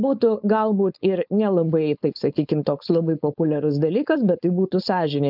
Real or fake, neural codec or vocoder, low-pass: fake; codec, 16 kHz, 4.8 kbps, FACodec; 5.4 kHz